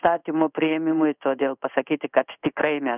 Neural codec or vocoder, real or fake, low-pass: codec, 16 kHz in and 24 kHz out, 1 kbps, XY-Tokenizer; fake; 3.6 kHz